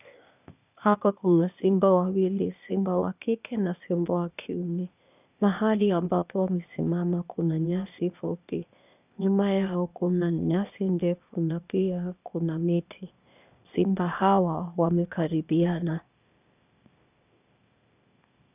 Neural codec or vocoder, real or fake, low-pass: codec, 16 kHz, 0.8 kbps, ZipCodec; fake; 3.6 kHz